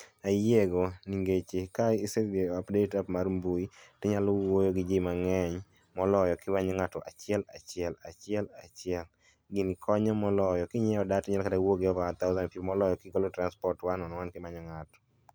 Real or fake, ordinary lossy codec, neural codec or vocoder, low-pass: real; none; none; none